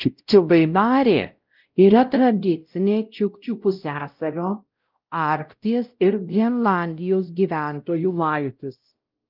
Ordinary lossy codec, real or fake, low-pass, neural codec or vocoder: Opus, 24 kbps; fake; 5.4 kHz; codec, 16 kHz, 0.5 kbps, X-Codec, WavLM features, trained on Multilingual LibriSpeech